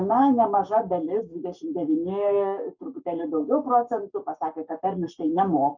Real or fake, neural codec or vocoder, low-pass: fake; codec, 44.1 kHz, 7.8 kbps, Pupu-Codec; 7.2 kHz